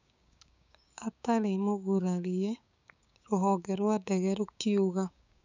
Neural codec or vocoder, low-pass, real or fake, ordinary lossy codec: codec, 16 kHz, 6 kbps, DAC; 7.2 kHz; fake; none